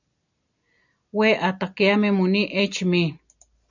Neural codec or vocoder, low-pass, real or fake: none; 7.2 kHz; real